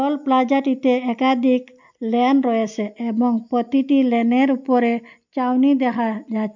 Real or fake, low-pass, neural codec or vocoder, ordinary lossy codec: real; 7.2 kHz; none; MP3, 64 kbps